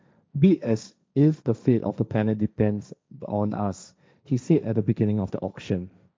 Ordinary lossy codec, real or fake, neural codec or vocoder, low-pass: none; fake; codec, 16 kHz, 1.1 kbps, Voila-Tokenizer; none